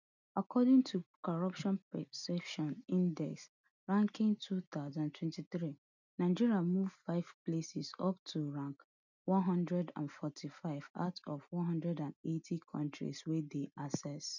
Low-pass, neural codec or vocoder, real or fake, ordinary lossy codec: 7.2 kHz; none; real; none